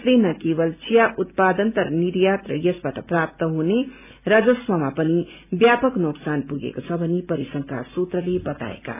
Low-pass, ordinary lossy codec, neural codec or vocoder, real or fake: 3.6 kHz; none; none; real